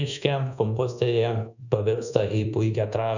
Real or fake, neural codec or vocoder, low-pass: fake; codec, 24 kHz, 1.2 kbps, DualCodec; 7.2 kHz